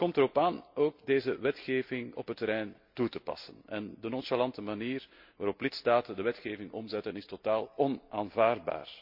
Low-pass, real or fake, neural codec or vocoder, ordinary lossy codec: 5.4 kHz; real; none; MP3, 48 kbps